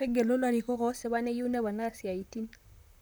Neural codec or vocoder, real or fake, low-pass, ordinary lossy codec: vocoder, 44.1 kHz, 128 mel bands, Pupu-Vocoder; fake; none; none